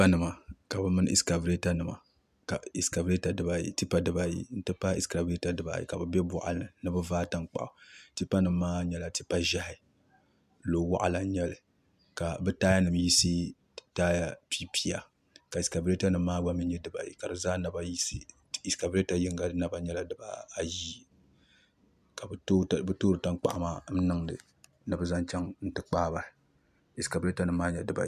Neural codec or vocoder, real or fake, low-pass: none; real; 14.4 kHz